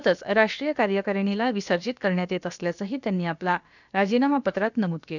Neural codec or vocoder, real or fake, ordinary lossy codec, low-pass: codec, 16 kHz, about 1 kbps, DyCAST, with the encoder's durations; fake; none; 7.2 kHz